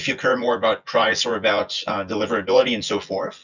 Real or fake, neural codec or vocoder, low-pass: fake; vocoder, 24 kHz, 100 mel bands, Vocos; 7.2 kHz